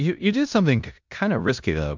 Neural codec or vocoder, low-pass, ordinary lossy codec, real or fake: codec, 16 kHz in and 24 kHz out, 0.9 kbps, LongCat-Audio-Codec, four codebook decoder; 7.2 kHz; MP3, 64 kbps; fake